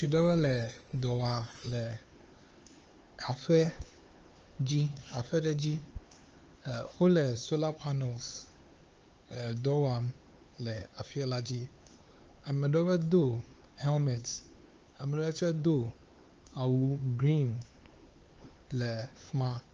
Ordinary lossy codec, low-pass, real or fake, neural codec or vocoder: Opus, 24 kbps; 7.2 kHz; fake; codec, 16 kHz, 4 kbps, X-Codec, HuBERT features, trained on LibriSpeech